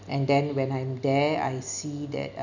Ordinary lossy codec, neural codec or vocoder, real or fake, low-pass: none; none; real; 7.2 kHz